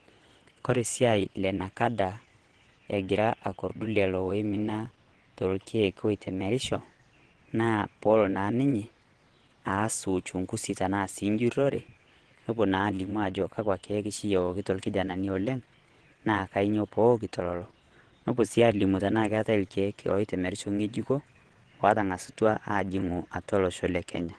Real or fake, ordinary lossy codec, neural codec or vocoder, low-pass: fake; Opus, 16 kbps; vocoder, 22.05 kHz, 80 mel bands, WaveNeXt; 9.9 kHz